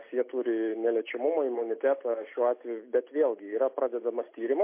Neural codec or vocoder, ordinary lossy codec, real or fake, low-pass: none; MP3, 24 kbps; real; 3.6 kHz